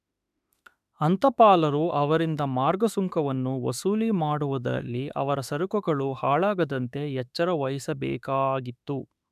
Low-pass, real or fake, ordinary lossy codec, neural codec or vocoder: 14.4 kHz; fake; none; autoencoder, 48 kHz, 32 numbers a frame, DAC-VAE, trained on Japanese speech